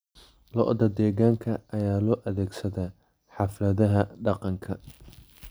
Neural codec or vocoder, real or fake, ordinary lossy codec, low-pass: none; real; none; none